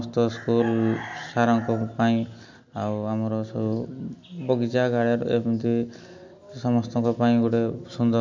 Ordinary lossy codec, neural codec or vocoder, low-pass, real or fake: MP3, 64 kbps; none; 7.2 kHz; real